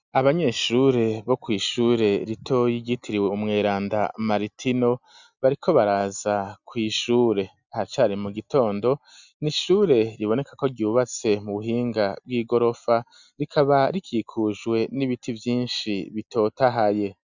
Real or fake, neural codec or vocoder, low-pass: real; none; 7.2 kHz